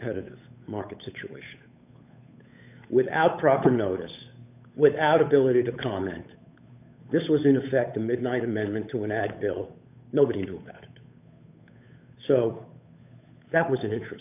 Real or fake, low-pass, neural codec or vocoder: fake; 3.6 kHz; codec, 16 kHz, 8 kbps, FunCodec, trained on Chinese and English, 25 frames a second